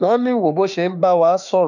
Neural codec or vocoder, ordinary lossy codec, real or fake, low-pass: autoencoder, 48 kHz, 32 numbers a frame, DAC-VAE, trained on Japanese speech; none; fake; 7.2 kHz